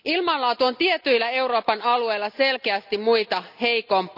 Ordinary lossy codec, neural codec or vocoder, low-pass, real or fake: none; none; 5.4 kHz; real